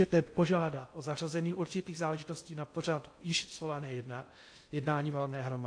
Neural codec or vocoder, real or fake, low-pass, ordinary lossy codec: codec, 16 kHz in and 24 kHz out, 0.6 kbps, FocalCodec, streaming, 2048 codes; fake; 9.9 kHz; AAC, 48 kbps